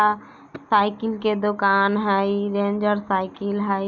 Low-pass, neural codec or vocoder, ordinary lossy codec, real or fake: 7.2 kHz; none; none; real